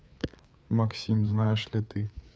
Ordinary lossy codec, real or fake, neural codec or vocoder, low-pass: none; fake; codec, 16 kHz, 4 kbps, FreqCodec, larger model; none